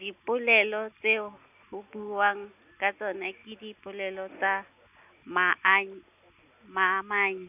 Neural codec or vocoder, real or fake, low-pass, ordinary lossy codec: none; real; 3.6 kHz; none